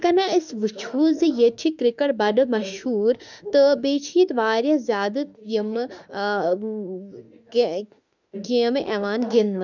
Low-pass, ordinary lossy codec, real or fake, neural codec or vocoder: 7.2 kHz; none; fake; autoencoder, 48 kHz, 32 numbers a frame, DAC-VAE, trained on Japanese speech